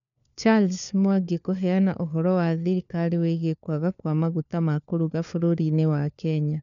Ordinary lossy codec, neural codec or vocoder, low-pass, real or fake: none; codec, 16 kHz, 4 kbps, FunCodec, trained on LibriTTS, 50 frames a second; 7.2 kHz; fake